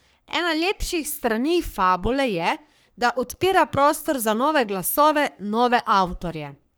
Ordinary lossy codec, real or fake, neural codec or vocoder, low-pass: none; fake; codec, 44.1 kHz, 3.4 kbps, Pupu-Codec; none